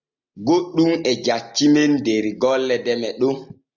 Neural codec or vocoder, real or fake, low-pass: none; real; 7.2 kHz